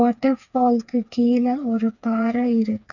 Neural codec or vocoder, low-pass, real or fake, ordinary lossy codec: codec, 16 kHz, 4 kbps, FreqCodec, smaller model; 7.2 kHz; fake; none